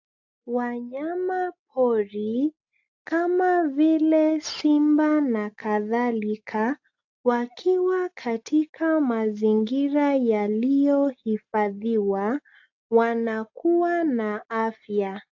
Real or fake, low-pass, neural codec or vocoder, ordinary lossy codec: real; 7.2 kHz; none; AAC, 48 kbps